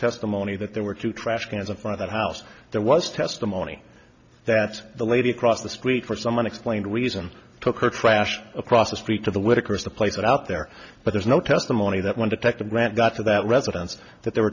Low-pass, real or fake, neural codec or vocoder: 7.2 kHz; real; none